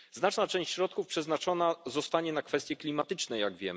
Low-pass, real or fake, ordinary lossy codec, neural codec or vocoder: none; real; none; none